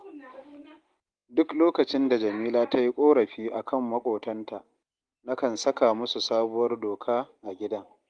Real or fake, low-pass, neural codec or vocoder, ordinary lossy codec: real; 9.9 kHz; none; Opus, 24 kbps